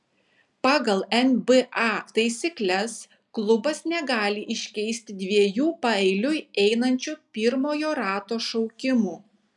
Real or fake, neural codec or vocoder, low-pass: real; none; 10.8 kHz